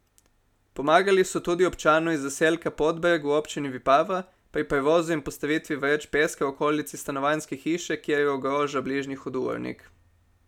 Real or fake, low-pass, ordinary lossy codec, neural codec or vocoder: real; 19.8 kHz; none; none